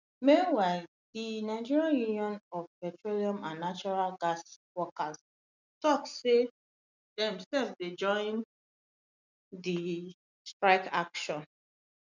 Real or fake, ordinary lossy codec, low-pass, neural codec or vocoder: real; none; 7.2 kHz; none